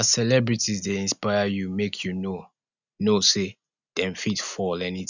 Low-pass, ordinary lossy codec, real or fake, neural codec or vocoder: 7.2 kHz; none; real; none